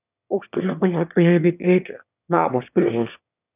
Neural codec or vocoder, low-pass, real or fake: autoencoder, 22.05 kHz, a latent of 192 numbers a frame, VITS, trained on one speaker; 3.6 kHz; fake